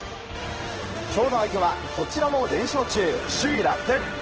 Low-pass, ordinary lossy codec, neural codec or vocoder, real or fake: 7.2 kHz; Opus, 16 kbps; codec, 16 kHz in and 24 kHz out, 1 kbps, XY-Tokenizer; fake